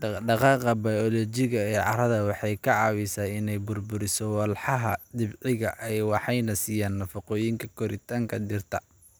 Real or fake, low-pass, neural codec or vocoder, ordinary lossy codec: real; none; none; none